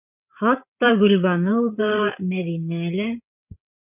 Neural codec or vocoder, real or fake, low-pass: codec, 16 kHz, 8 kbps, FreqCodec, larger model; fake; 3.6 kHz